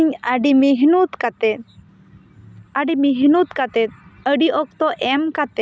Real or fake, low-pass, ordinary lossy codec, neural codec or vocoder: real; none; none; none